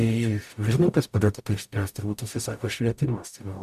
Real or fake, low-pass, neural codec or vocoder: fake; 14.4 kHz; codec, 44.1 kHz, 0.9 kbps, DAC